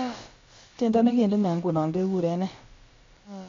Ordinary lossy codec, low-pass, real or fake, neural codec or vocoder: AAC, 32 kbps; 7.2 kHz; fake; codec, 16 kHz, about 1 kbps, DyCAST, with the encoder's durations